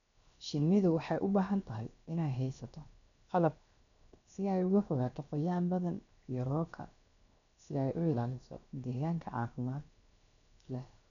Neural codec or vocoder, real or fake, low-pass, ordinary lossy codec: codec, 16 kHz, 0.7 kbps, FocalCodec; fake; 7.2 kHz; none